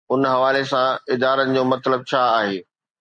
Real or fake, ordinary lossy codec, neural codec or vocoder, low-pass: real; MP3, 64 kbps; none; 9.9 kHz